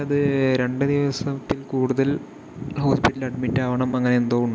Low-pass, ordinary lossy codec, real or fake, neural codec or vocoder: none; none; real; none